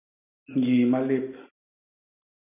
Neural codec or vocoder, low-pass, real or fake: none; 3.6 kHz; real